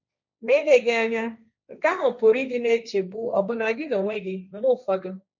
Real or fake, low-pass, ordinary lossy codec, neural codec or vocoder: fake; 7.2 kHz; none; codec, 16 kHz, 1.1 kbps, Voila-Tokenizer